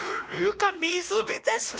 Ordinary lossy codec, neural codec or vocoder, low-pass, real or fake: none; codec, 16 kHz, 1 kbps, X-Codec, WavLM features, trained on Multilingual LibriSpeech; none; fake